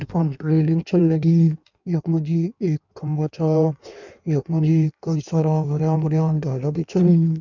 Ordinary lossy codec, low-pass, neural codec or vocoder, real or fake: none; 7.2 kHz; codec, 16 kHz in and 24 kHz out, 1.1 kbps, FireRedTTS-2 codec; fake